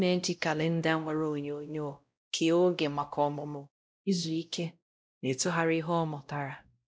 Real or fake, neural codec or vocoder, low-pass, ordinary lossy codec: fake; codec, 16 kHz, 0.5 kbps, X-Codec, WavLM features, trained on Multilingual LibriSpeech; none; none